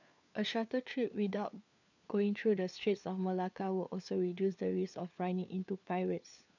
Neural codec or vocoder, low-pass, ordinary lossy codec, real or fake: codec, 16 kHz, 4 kbps, FunCodec, trained on LibriTTS, 50 frames a second; 7.2 kHz; none; fake